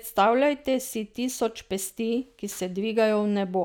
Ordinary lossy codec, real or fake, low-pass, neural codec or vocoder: none; real; none; none